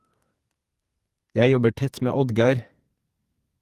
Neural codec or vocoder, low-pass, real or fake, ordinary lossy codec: codec, 44.1 kHz, 2.6 kbps, SNAC; 14.4 kHz; fake; Opus, 24 kbps